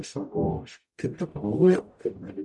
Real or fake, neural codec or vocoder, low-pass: fake; codec, 44.1 kHz, 0.9 kbps, DAC; 10.8 kHz